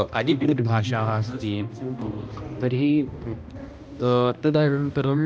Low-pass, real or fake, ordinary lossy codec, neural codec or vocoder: none; fake; none; codec, 16 kHz, 1 kbps, X-Codec, HuBERT features, trained on balanced general audio